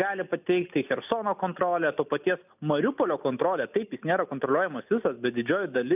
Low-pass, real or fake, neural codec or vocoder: 3.6 kHz; real; none